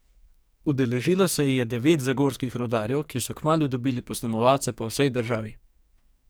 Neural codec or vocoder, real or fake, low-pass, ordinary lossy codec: codec, 44.1 kHz, 2.6 kbps, SNAC; fake; none; none